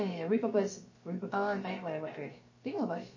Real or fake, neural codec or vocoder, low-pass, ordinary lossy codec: fake; codec, 16 kHz, about 1 kbps, DyCAST, with the encoder's durations; 7.2 kHz; MP3, 32 kbps